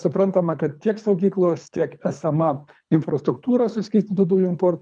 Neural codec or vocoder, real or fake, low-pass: codec, 24 kHz, 3 kbps, HILCodec; fake; 9.9 kHz